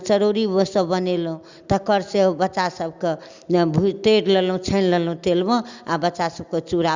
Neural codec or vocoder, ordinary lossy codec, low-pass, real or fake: none; Opus, 64 kbps; 7.2 kHz; real